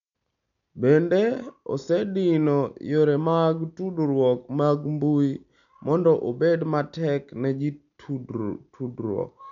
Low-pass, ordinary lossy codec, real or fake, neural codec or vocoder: 7.2 kHz; none; real; none